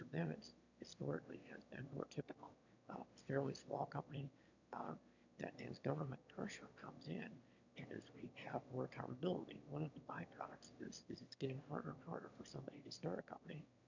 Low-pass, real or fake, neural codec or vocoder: 7.2 kHz; fake; autoencoder, 22.05 kHz, a latent of 192 numbers a frame, VITS, trained on one speaker